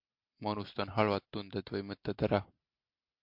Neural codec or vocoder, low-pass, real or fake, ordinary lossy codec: none; 5.4 kHz; real; MP3, 48 kbps